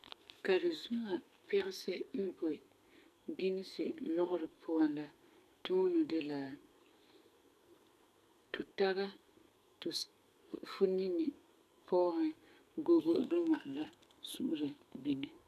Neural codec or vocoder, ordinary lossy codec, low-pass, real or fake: codec, 44.1 kHz, 2.6 kbps, SNAC; none; 14.4 kHz; fake